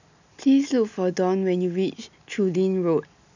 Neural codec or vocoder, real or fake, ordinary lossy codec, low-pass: none; real; none; 7.2 kHz